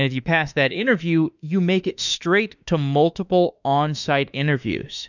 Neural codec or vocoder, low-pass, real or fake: autoencoder, 48 kHz, 32 numbers a frame, DAC-VAE, trained on Japanese speech; 7.2 kHz; fake